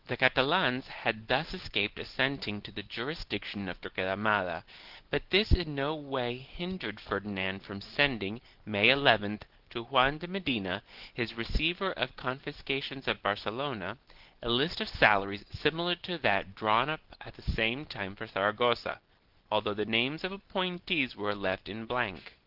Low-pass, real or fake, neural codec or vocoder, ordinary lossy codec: 5.4 kHz; real; none; Opus, 16 kbps